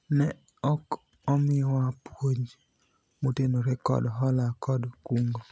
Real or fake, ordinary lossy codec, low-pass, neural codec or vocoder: real; none; none; none